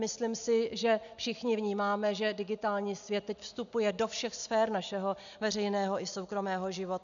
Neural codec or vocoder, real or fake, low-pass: none; real; 7.2 kHz